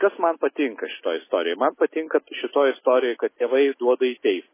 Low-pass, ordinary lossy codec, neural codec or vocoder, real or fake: 3.6 kHz; MP3, 16 kbps; none; real